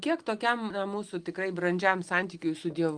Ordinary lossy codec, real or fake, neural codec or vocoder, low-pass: Opus, 24 kbps; real; none; 9.9 kHz